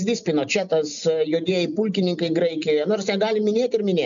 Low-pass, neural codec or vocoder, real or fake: 7.2 kHz; none; real